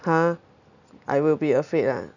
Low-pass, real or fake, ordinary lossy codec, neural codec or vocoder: 7.2 kHz; real; none; none